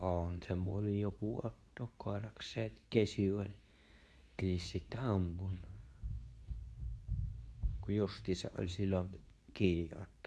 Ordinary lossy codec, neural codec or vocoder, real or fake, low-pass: none; codec, 24 kHz, 0.9 kbps, WavTokenizer, medium speech release version 2; fake; none